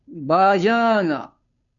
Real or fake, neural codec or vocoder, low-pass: fake; codec, 16 kHz, 2 kbps, FunCodec, trained on Chinese and English, 25 frames a second; 7.2 kHz